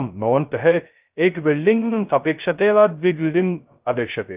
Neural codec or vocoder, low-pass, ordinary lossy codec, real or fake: codec, 16 kHz, 0.2 kbps, FocalCodec; 3.6 kHz; Opus, 24 kbps; fake